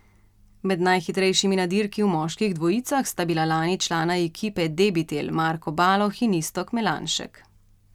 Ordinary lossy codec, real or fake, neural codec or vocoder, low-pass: none; real; none; 19.8 kHz